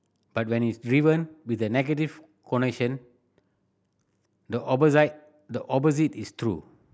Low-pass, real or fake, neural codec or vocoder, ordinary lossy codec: none; real; none; none